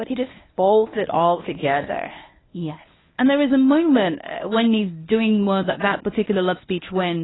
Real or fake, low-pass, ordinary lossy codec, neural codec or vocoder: fake; 7.2 kHz; AAC, 16 kbps; codec, 24 kHz, 0.9 kbps, WavTokenizer, medium speech release version 1